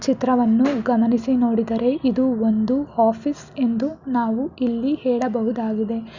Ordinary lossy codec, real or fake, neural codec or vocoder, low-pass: Opus, 64 kbps; real; none; 7.2 kHz